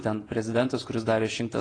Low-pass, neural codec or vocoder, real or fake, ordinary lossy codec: 9.9 kHz; vocoder, 48 kHz, 128 mel bands, Vocos; fake; AAC, 32 kbps